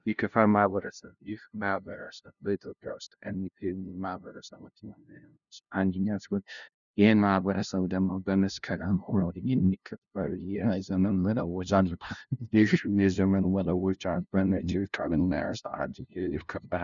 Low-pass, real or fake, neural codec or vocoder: 7.2 kHz; fake; codec, 16 kHz, 0.5 kbps, FunCodec, trained on LibriTTS, 25 frames a second